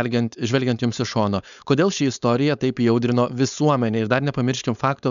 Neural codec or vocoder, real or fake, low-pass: codec, 16 kHz, 4.8 kbps, FACodec; fake; 7.2 kHz